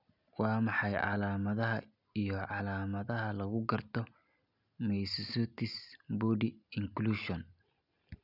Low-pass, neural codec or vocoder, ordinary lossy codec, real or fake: 5.4 kHz; none; none; real